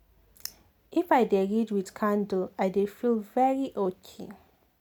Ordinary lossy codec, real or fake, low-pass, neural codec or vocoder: none; real; none; none